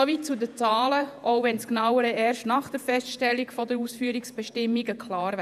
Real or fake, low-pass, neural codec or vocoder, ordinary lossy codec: fake; 14.4 kHz; vocoder, 48 kHz, 128 mel bands, Vocos; none